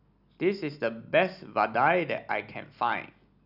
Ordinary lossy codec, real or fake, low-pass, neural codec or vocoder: none; real; 5.4 kHz; none